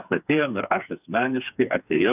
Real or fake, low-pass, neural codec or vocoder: fake; 3.6 kHz; codec, 16 kHz, 4 kbps, FreqCodec, smaller model